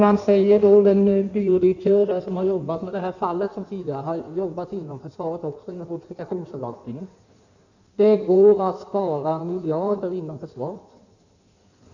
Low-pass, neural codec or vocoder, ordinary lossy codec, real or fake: 7.2 kHz; codec, 16 kHz in and 24 kHz out, 1.1 kbps, FireRedTTS-2 codec; none; fake